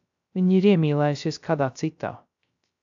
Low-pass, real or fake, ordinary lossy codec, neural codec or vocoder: 7.2 kHz; fake; AAC, 64 kbps; codec, 16 kHz, 0.3 kbps, FocalCodec